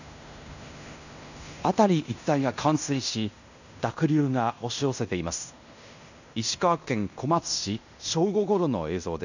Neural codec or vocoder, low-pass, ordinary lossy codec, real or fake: codec, 16 kHz in and 24 kHz out, 0.9 kbps, LongCat-Audio-Codec, fine tuned four codebook decoder; 7.2 kHz; none; fake